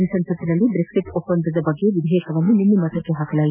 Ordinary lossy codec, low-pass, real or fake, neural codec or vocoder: none; 3.6 kHz; real; none